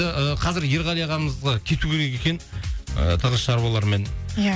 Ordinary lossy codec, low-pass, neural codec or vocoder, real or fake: none; none; none; real